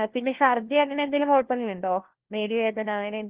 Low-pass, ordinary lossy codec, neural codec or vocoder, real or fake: 3.6 kHz; Opus, 16 kbps; codec, 16 kHz, 1 kbps, FunCodec, trained on LibriTTS, 50 frames a second; fake